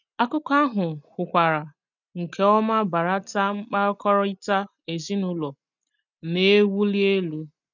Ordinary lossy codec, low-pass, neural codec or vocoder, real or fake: none; 7.2 kHz; none; real